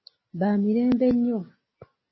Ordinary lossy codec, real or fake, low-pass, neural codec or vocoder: MP3, 24 kbps; real; 7.2 kHz; none